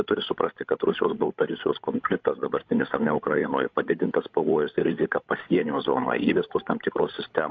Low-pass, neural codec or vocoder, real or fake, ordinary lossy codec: 7.2 kHz; codec, 16 kHz, 16 kbps, FreqCodec, larger model; fake; AAC, 48 kbps